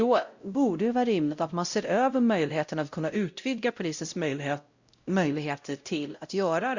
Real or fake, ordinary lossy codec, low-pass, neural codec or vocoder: fake; Opus, 64 kbps; 7.2 kHz; codec, 16 kHz, 0.5 kbps, X-Codec, WavLM features, trained on Multilingual LibriSpeech